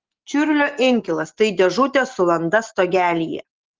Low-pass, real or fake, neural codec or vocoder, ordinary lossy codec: 7.2 kHz; real; none; Opus, 16 kbps